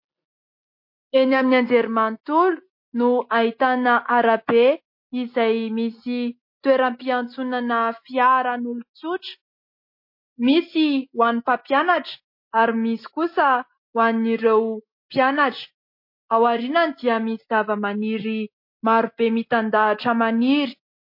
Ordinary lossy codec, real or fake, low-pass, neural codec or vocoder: MP3, 32 kbps; real; 5.4 kHz; none